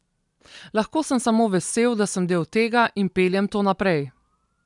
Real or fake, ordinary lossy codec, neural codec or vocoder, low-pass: real; none; none; 10.8 kHz